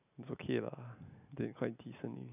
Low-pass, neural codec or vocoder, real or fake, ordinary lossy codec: 3.6 kHz; none; real; none